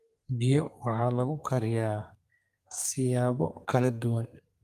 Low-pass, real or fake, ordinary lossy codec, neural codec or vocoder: 14.4 kHz; fake; Opus, 32 kbps; codec, 32 kHz, 1.9 kbps, SNAC